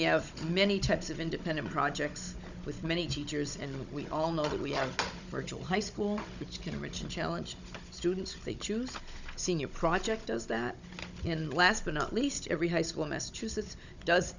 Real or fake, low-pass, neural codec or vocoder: fake; 7.2 kHz; codec, 16 kHz, 16 kbps, FunCodec, trained on Chinese and English, 50 frames a second